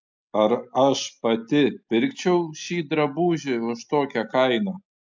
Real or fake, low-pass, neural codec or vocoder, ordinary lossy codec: real; 7.2 kHz; none; MP3, 64 kbps